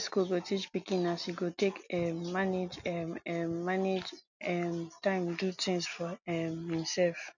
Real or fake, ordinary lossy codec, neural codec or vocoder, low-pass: real; none; none; 7.2 kHz